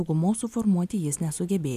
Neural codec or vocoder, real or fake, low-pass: none; real; 14.4 kHz